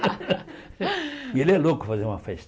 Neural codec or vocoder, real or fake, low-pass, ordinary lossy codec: none; real; none; none